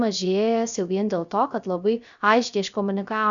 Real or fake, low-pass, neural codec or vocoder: fake; 7.2 kHz; codec, 16 kHz, 0.3 kbps, FocalCodec